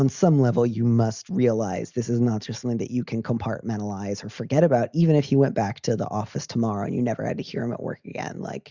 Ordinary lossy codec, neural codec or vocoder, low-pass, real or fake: Opus, 64 kbps; none; 7.2 kHz; real